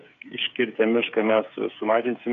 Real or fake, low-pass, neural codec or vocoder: fake; 7.2 kHz; codec, 16 kHz, 8 kbps, FreqCodec, smaller model